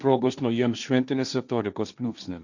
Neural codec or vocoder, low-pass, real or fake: codec, 16 kHz, 1.1 kbps, Voila-Tokenizer; 7.2 kHz; fake